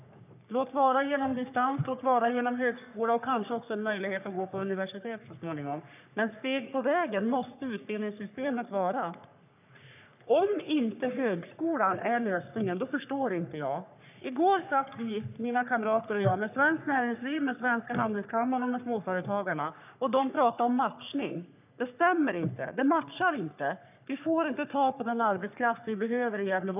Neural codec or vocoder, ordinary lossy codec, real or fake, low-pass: codec, 44.1 kHz, 3.4 kbps, Pupu-Codec; none; fake; 3.6 kHz